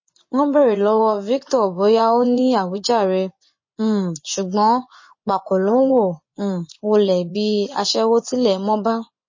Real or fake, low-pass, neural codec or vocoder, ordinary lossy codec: fake; 7.2 kHz; vocoder, 24 kHz, 100 mel bands, Vocos; MP3, 32 kbps